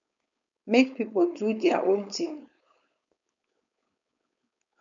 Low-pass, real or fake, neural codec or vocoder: 7.2 kHz; fake; codec, 16 kHz, 4.8 kbps, FACodec